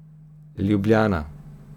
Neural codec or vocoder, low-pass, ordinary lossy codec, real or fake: none; 19.8 kHz; none; real